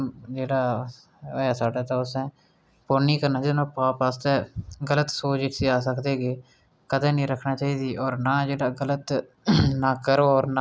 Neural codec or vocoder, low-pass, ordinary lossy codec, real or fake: none; none; none; real